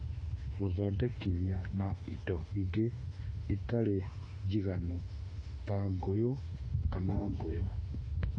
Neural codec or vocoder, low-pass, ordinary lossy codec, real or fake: autoencoder, 48 kHz, 32 numbers a frame, DAC-VAE, trained on Japanese speech; 9.9 kHz; AAC, 32 kbps; fake